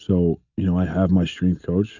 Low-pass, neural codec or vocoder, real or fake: 7.2 kHz; none; real